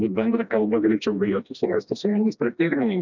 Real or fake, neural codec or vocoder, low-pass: fake; codec, 16 kHz, 1 kbps, FreqCodec, smaller model; 7.2 kHz